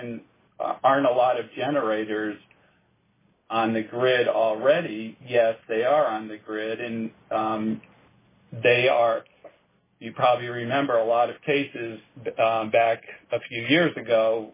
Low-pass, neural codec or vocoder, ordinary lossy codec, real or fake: 3.6 kHz; none; MP3, 16 kbps; real